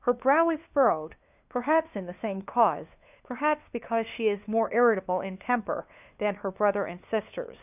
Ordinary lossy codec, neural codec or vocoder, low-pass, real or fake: AAC, 32 kbps; codec, 16 kHz, 2 kbps, FunCodec, trained on LibriTTS, 25 frames a second; 3.6 kHz; fake